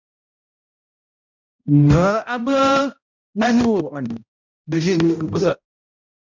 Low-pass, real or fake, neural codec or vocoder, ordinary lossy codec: 7.2 kHz; fake; codec, 16 kHz, 0.5 kbps, X-Codec, HuBERT features, trained on balanced general audio; MP3, 64 kbps